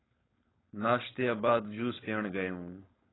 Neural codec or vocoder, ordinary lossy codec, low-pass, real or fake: codec, 16 kHz, 4.8 kbps, FACodec; AAC, 16 kbps; 7.2 kHz; fake